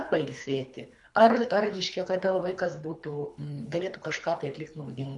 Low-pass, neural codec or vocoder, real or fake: 10.8 kHz; codec, 24 kHz, 3 kbps, HILCodec; fake